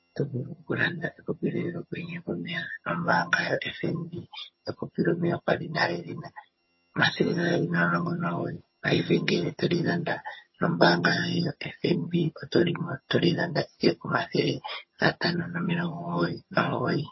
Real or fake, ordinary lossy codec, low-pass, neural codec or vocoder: fake; MP3, 24 kbps; 7.2 kHz; vocoder, 22.05 kHz, 80 mel bands, HiFi-GAN